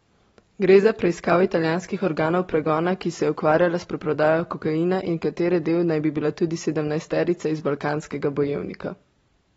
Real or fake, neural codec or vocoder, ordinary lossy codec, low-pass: fake; vocoder, 44.1 kHz, 128 mel bands every 512 samples, BigVGAN v2; AAC, 24 kbps; 19.8 kHz